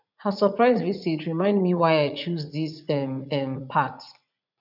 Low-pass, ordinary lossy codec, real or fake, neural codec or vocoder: 5.4 kHz; none; fake; vocoder, 44.1 kHz, 80 mel bands, Vocos